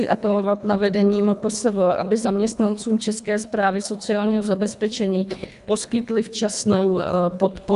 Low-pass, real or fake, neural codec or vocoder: 10.8 kHz; fake; codec, 24 kHz, 1.5 kbps, HILCodec